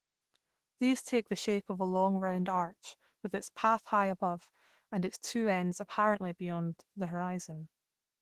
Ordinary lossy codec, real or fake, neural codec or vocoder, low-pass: Opus, 16 kbps; fake; autoencoder, 48 kHz, 32 numbers a frame, DAC-VAE, trained on Japanese speech; 14.4 kHz